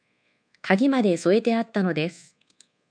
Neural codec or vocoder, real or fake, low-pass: codec, 24 kHz, 1.2 kbps, DualCodec; fake; 9.9 kHz